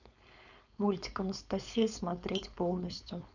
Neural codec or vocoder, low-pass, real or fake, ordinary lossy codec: codec, 16 kHz, 4.8 kbps, FACodec; 7.2 kHz; fake; none